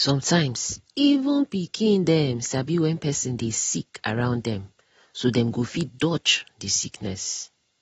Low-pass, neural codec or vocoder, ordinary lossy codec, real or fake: 19.8 kHz; none; AAC, 24 kbps; real